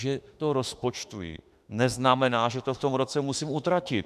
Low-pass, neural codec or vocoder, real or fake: 14.4 kHz; autoencoder, 48 kHz, 32 numbers a frame, DAC-VAE, trained on Japanese speech; fake